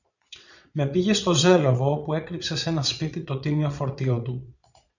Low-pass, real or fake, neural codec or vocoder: 7.2 kHz; fake; vocoder, 22.05 kHz, 80 mel bands, Vocos